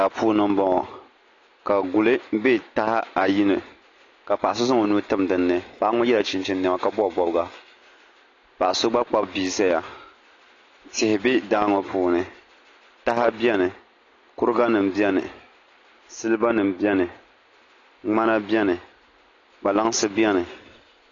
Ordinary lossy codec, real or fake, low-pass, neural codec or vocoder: AAC, 32 kbps; real; 7.2 kHz; none